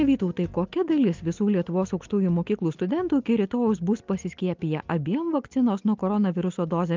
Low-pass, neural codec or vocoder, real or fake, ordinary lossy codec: 7.2 kHz; none; real; Opus, 32 kbps